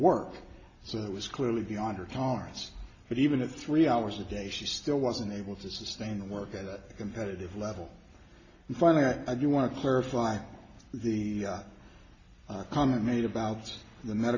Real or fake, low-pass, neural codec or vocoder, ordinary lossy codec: real; 7.2 kHz; none; AAC, 32 kbps